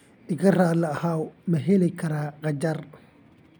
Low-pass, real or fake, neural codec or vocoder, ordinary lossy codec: none; real; none; none